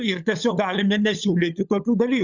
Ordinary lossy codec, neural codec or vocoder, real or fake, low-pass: Opus, 64 kbps; codec, 16 kHz, 16 kbps, FunCodec, trained on LibriTTS, 50 frames a second; fake; 7.2 kHz